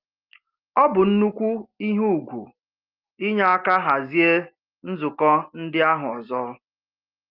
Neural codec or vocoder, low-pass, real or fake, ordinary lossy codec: none; 5.4 kHz; real; Opus, 32 kbps